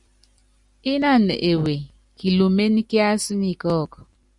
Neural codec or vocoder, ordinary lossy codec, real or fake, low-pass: none; Opus, 64 kbps; real; 10.8 kHz